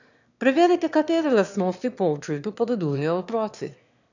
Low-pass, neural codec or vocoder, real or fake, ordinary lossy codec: 7.2 kHz; autoencoder, 22.05 kHz, a latent of 192 numbers a frame, VITS, trained on one speaker; fake; none